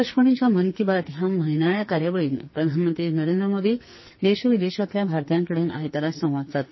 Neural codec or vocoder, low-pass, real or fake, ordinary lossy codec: codec, 44.1 kHz, 2.6 kbps, SNAC; 7.2 kHz; fake; MP3, 24 kbps